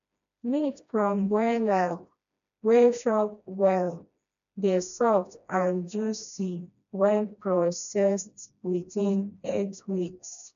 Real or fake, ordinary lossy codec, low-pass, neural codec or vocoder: fake; none; 7.2 kHz; codec, 16 kHz, 1 kbps, FreqCodec, smaller model